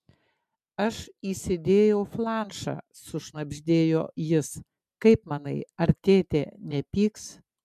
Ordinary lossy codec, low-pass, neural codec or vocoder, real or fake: MP3, 96 kbps; 14.4 kHz; none; real